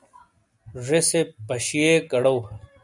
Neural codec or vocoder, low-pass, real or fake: none; 10.8 kHz; real